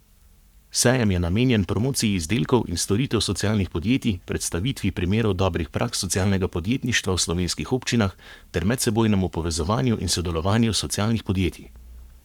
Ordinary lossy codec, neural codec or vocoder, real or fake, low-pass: none; codec, 44.1 kHz, 7.8 kbps, Pupu-Codec; fake; 19.8 kHz